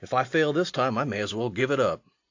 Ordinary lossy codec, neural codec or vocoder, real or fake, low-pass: AAC, 48 kbps; none; real; 7.2 kHz